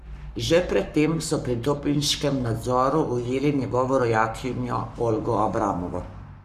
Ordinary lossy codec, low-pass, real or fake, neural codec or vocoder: none; 14.4 kHz; fake; codec, 44.1 kHz, 7.8 kbps, Pupu-Codec